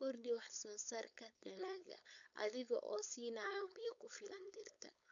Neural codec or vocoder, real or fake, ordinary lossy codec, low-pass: codec, 16 kHz, 4.8 kbps, FACodec; fake; none; 7.2 kHz